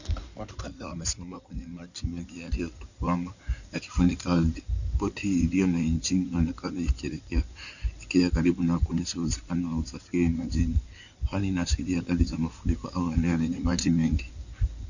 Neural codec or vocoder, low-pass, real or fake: codec, 16 kHz in and 24 kHz out, 2.2 kbps, FireRedTTS-2 codec; 7.2 kHz; fake